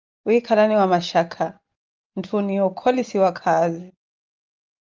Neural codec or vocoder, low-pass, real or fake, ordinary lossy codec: none; 7.2 kHz; real; Opus, 24 kbps